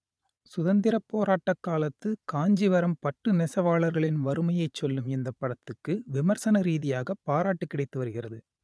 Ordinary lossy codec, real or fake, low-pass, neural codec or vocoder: none; fake; none; vocoder, 22.05 kHz, 80 mel bands, Vocos